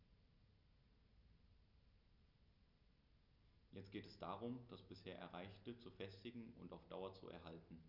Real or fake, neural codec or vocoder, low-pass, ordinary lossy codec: real; none; 5.4 kHz; none